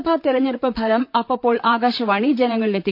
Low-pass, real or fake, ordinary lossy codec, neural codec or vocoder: 5.4 kHz; fake; none; vocoder, 44.1 kHz, 128 mel bands, Pupu-Vocoder